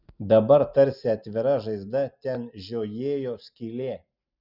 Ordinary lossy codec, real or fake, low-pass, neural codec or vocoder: Opus, 64 kbps; real; 5.4 kHz; none